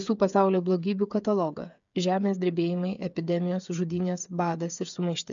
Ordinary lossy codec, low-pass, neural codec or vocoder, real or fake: MP3, 64 kbps; 7.2 kHz; codec, 16 kHz, 8 kbps, FreqCodec, smaller model; fake